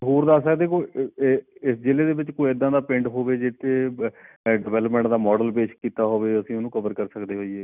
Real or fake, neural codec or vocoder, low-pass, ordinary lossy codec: real; none; 3.6 kHz; none